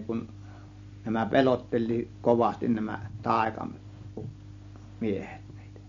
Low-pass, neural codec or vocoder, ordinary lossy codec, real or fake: 7.2 kHz; none; MP3, 48 kbps; real